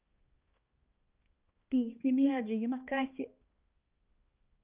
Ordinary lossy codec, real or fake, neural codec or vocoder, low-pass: Opus, 24 kbps; fake; codec, 16 kHz, 2 kbps, X-Codec, HuBERT features, trained on balanced general audio; 3.6 kHz